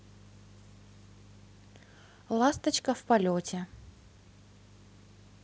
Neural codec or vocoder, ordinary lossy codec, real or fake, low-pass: none; none; real; none